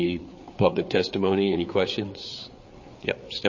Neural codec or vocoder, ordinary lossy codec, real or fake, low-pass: codec, 16 kHz, 4 kbps, FreqCodec, larger model; MP3, 32 kbps; fake; 7.2 kHz